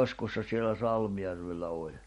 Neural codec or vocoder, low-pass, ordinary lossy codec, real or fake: none; 19.8 kHz; MP3, 48 kbps; real